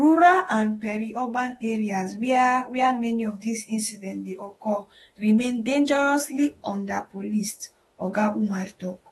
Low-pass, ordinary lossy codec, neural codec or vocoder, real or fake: 19.8 kHz; AAC, 32 kbps; autoencoder, 48 kHz, 32 numbers a frame, DAC-VAE, trained on Japanese speech; fake